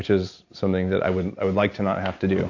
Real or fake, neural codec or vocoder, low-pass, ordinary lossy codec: real; none; 7.2 kHz; AAC, 48 kbps